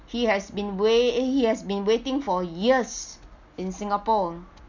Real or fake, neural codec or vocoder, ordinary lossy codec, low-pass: real; none; none; 7.2 kHz